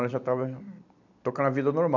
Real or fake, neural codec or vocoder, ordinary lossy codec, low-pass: real; none; none; 7.2 kHz